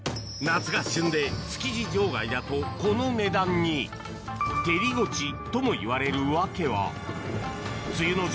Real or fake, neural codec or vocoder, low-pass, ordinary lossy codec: real; none; none; none